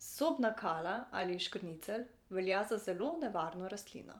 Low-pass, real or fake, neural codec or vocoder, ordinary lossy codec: 19.8 kHz; fake; vocoder, 44.1 kHz, 128 mel bands every 512 samples, BigVGAN v2; none